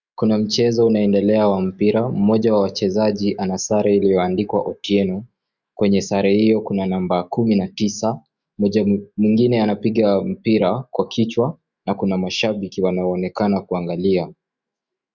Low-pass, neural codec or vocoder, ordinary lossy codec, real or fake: 7.2 kHz; autoencoder, 48 kHz, 128 numbers a frame, DAC-VAE, trained on Japanese speech; Opus, 64 kbps; fake